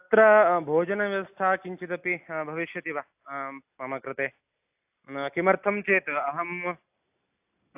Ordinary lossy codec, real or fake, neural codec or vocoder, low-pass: AAC, 32 kbps; real; none; 3.6 kHz